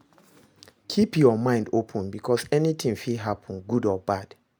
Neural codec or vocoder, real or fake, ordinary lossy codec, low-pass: none; real; none; none